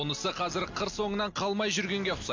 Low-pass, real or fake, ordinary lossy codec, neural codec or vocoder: 7.2 kHz; real; MP3, 48 kbps; none